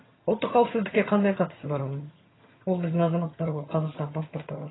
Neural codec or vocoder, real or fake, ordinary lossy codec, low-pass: vocoder, 22.05 kHz, 80 mel bands, HiFi-GAN; fake; AAC, 16 kbps; 7.2 kHz